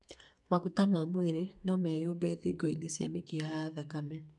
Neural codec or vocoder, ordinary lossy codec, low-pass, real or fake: codec, 32 kHz, 1.9 kbps, SNAC; none; 10.8 kHz; fake